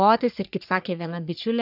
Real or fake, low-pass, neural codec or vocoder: fake; 5.4 kHz; codec, 44.1 kHz, 3.4 kbps, Pupu-Codec